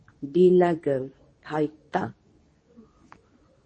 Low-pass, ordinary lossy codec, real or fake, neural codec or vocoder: 9.9 kHz; MP3, 32 kbps; fake; codec, 24 kHz, 0.9 kbps, WavTokenizer, medium speech release version 2